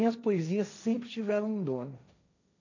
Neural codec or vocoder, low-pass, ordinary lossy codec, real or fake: codec, 16 kHz, 1.1 kbps, Voila-Tokenizer; 7.2 kHz; none; fake